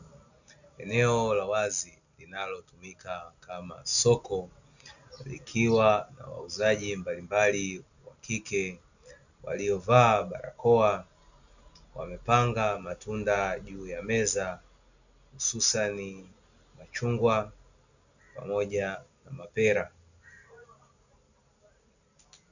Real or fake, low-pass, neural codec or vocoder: real; 7.2 kHz; none